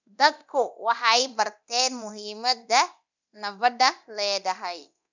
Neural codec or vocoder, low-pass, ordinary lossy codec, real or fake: codec, 24 kHz, 0.9 kbps, DualCodec; 7.2 kHz; none; fake